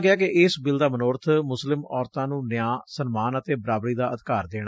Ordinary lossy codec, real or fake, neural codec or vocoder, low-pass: none; real; none; none